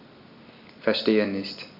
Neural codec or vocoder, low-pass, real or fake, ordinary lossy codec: none; 5.4 kHz; real; none